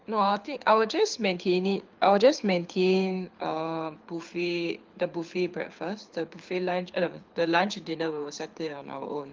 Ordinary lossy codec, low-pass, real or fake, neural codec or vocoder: Opus, 24 kbps; 7.2 kHz; fake; codec, 24 kHz, 6 kbps, HILCodec